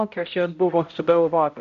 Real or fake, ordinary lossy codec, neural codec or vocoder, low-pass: fake; MP3, 64 kbps; codec, 16 kHz, 0.5 kbps, X-Codec, HuBERT features, trained on balanced general audio; 7.2 kHz